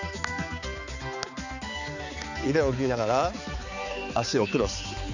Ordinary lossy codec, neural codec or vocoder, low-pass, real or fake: none; codec, 16 kHz, 4 kbps, X-Codec, HuBERT features, trained on balanced general audio; 7.2 kHz; fake